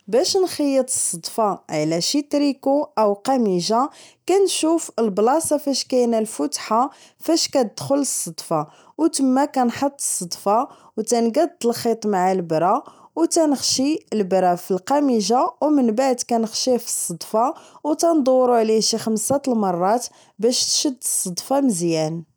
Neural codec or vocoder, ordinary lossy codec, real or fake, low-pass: none; none; real; none